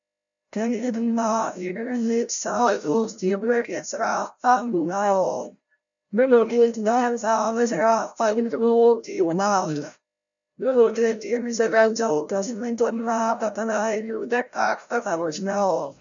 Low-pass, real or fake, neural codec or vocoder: 7.2 kHz; fake; codec, 16 kHz, 0.5 kbps, FreqCodec, larger model